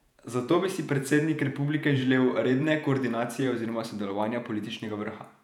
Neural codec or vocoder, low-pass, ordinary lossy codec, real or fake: vocoder, 48 kHz, 128 mel bands, Vocos; 19.8 kHz; none; fake